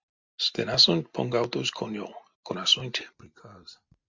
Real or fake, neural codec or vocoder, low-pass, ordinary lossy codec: real; none; 7.2 kHz; MP3, 64 kbps